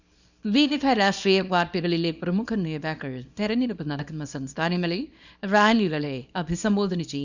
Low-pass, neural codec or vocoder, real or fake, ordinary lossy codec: 7.2 kHz; codec, 24 kHz, 0.9 kbps, WavTokenizer, small release; fake; none